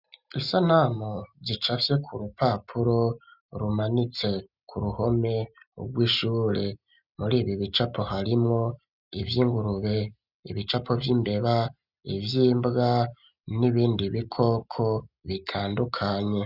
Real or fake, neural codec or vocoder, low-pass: real; none; 5.4 kHz